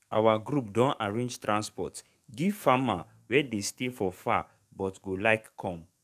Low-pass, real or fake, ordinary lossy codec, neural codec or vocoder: 14.4 kHz; fake; none; codec, 44.1 kHz, 7.8 kbps, DAC